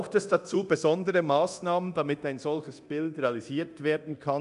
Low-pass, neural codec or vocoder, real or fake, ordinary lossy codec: 10.8 kHz; codec, 24 kHz, 0.9 kbps, DualCodec; fake; none